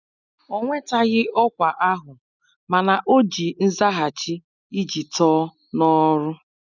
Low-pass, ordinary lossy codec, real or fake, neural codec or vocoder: 7.2 kHz; none; real; none